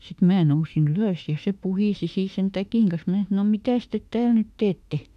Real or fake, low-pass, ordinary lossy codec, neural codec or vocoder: fake; 14.4 kHz; none; autoencoder, 48 kHz, 32 numbers a frame, DAC-VAE, trained on Japanese speech